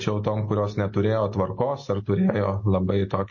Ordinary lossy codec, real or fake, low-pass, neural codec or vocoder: MP3, 32 kbps; real; 7.2 kHz; none